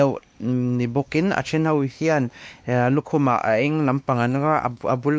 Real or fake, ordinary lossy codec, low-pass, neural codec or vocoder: fake; none; none; codec, 16 kHz, 2 kbps, X-Codec, WavLM features, trained on Multilingual LibriSpeech